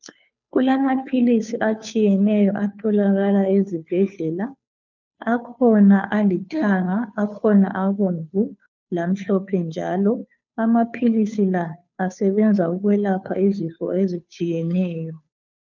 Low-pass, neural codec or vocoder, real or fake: 7.2 kHz; codec, 16 kHz, 2 kbps, FunCodec, trained on Chinese and English, 25 frames a second; fake